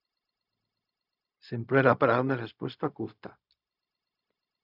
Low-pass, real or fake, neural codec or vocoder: 5.4 kHz; fake; codec, 16 kHz, 0.4 kbps, LongCat-Audio-Codec